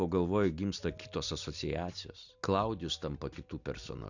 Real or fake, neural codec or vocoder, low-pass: real; none; 7.2 kHz